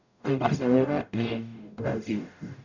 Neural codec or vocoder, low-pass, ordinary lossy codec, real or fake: codec, 44.1 kHz, 0.9 kbps, DAC; 7.2 kHz; none; fake